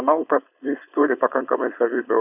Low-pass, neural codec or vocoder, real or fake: 3.6 kHz; codec, 16 kHz, 4.8 kbps, FACodec; fake